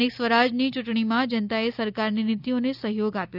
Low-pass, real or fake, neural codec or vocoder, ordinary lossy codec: 5.4 kHz; real; none; none